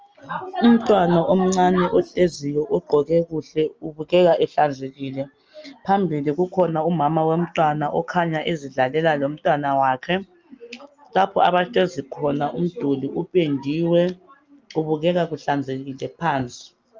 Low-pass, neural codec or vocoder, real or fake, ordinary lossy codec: 7.2 kHz; none; real; Opus, 24 kbps